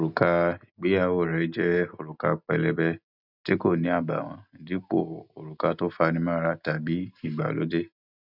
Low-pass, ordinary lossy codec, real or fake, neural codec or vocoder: 5.4 kHz; none; real; none